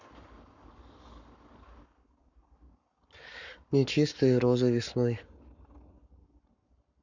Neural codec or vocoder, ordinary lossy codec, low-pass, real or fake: codec, 44.1 kHz, 7.8 kbps, Pupu-Codec; none; 7.2 kHz; fake